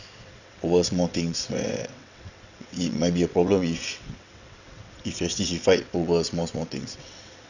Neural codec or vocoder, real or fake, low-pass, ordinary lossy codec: vocoder, 22.05 kHz, 80 mel bands, WaveNeXt; fake; 7.2 kHz; none